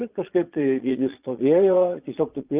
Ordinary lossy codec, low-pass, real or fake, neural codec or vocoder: Opus, 24 kbps; 3.6 kHz; fake; vocoder, 22.05 kHz, 80 mel bands, Vocos